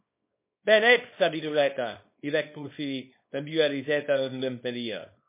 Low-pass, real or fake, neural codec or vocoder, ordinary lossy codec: 3.6 kHz; fake; codec, 24 kHz, 0.9 kbps, WavTokenizer, small release; MP3, 24 kbps